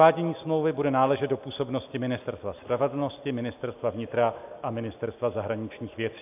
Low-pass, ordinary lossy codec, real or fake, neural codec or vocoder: 3.6 kHz; AAC, 32 kbps; real; none